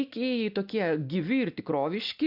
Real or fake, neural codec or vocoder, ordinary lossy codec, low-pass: real; none; AAC, 48 kbps; 5.4 kHz